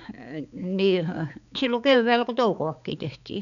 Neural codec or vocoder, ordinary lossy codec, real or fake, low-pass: codec, 16 kHz, 4 kbps, X-Codec, HuBERT features, trained on balanced general audio; none; fake; 7.2 kHz